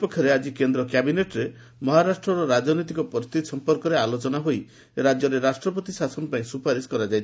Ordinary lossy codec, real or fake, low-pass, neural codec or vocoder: none; real; none; none